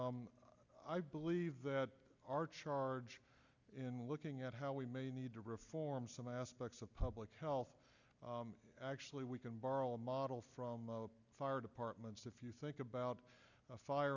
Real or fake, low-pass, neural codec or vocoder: real; 7.2 kHz; none